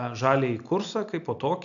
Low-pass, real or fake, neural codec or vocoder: 7.2 kHz; real; none